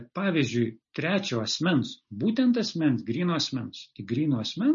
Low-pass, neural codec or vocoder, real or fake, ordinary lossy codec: 7.2 kHz; none; real; MP3, 32 kbps